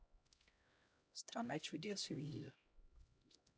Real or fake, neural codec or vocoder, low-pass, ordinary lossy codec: fake; codec, 16 kHz, 0.5 kbps, X-Codec, HuBERT features, trained on LibriSpeech; none; none